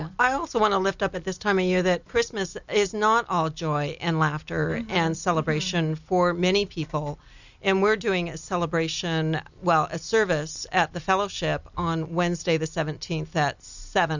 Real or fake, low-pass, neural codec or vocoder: real; 7.2 kHz; none